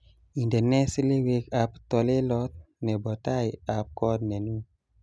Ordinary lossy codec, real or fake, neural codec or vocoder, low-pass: none; real; none; none